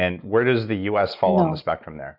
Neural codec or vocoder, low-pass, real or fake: none; 5.4 kHz; real